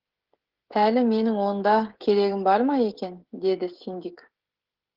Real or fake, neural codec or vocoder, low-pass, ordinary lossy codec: fake; codec, 16 kHz, 16 kbps, FreqCodec, smaller model; 5.4 kHz; Opus, 16 kbps